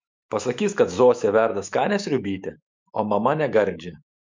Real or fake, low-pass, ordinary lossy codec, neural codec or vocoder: fake; 7.2 kHz; MP3, 64 kbps; autoencoder, 48 kHz, 128 numbers a frame, DAC-VAE, trained on Japanese speech